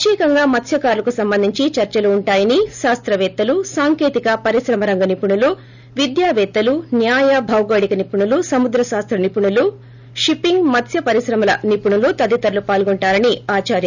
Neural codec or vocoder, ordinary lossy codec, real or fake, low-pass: none; none; real; 7.2 kHz